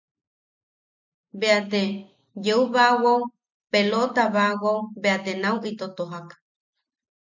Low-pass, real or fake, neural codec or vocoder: 7.2 kHz; real; none